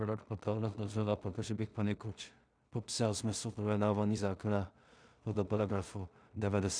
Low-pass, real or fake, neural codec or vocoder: 9.9 kHz; fake; codec, 16 kHz in and 24 kHz out, 0.4 kbps, LongCat-Audio-Codec, two codebook decoder